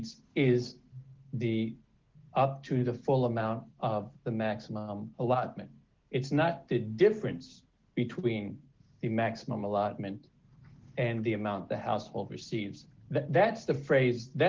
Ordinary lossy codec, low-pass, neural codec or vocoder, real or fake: Opus, 16 kbps; 7.2 kHz; none; real